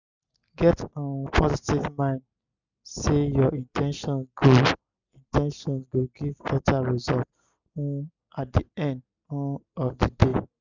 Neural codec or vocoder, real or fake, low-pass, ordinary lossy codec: none; real; 7.2 kHz; none